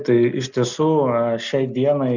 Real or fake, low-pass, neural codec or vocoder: real; 7.2 kHz; none